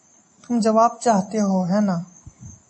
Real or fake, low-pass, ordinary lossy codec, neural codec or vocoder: real; 9.9 kHz; MP3, 32 kbps; none